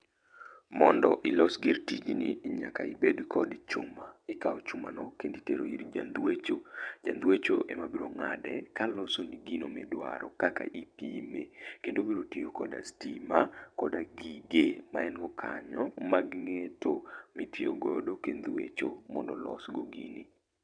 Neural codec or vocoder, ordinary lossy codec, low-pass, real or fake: vocoder, 22.05 kHz, 80 mel bands, WaveNeXt; MP3, 96 kbps; 9.9 kHz; fake